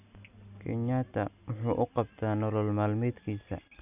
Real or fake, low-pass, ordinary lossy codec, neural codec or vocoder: real; 3.6 kHz; none; none